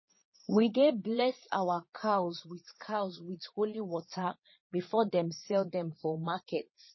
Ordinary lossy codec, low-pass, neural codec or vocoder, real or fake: MP3, 24 kbps; 7.2 kHz; vocoder, 22.05 kHz, 80 mel bands, Vocos; fake